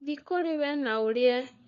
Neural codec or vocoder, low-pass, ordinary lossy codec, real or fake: codec, 16 kHz, 4 kbps, FunCodec, trained on LibriTTS, 50 frames a second; 7.2 kHz; none; fake